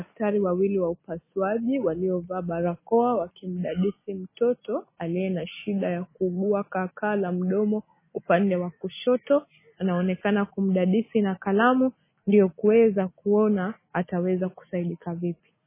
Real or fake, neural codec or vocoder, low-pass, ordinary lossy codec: real; none; 3.6 kHz; MP3, 16 kbps